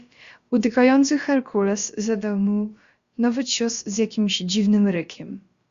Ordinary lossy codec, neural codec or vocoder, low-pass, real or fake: Opus, 64 kbps; codec, 16 kHz, about 1 kbps, DyCAST, with the encoder's durations; 7.2 kHz; fake